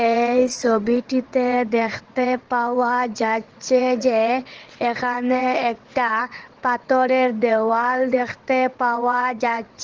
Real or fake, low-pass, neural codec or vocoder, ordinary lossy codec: fake; 7.2 kHz; vocoder, 22.05 kHz, 80 mel bands, WaveNeXt; Opus, 16 kbps